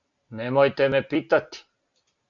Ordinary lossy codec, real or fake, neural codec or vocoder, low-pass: AAC, 64 kbps; real; none; 7.2 kHz